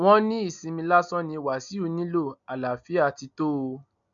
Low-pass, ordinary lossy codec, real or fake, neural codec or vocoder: 7.2 kHz; none; real; none